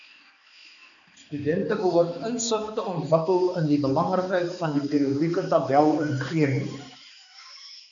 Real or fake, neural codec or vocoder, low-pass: fake; codec, 16 kHz, 2 kbps, X-Codec, HuBERT features, trained on balanced general audio; 7.2 kHz